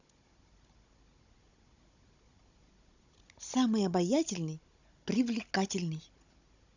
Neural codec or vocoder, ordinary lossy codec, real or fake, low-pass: codec, 16 kHz, 16 kbps, FunCodec, trained on Chinese and English, 50 frames a second; none; fake; 7.2 kHz